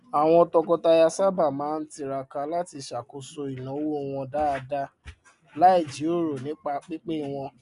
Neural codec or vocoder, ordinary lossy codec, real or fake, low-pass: none; none; real; 10.8 kHz